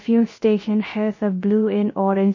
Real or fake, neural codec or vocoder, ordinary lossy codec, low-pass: fake; codec, 16 kHz, 0.3 kbps, FocalCodec; MP3, 32 kbps; 7.2 kHz